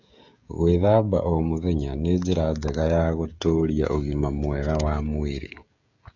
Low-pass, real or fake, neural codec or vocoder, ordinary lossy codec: 7.2 kHz; fake; codec, 16 kHz, 16 kbps, FreqCodec, smaller model; none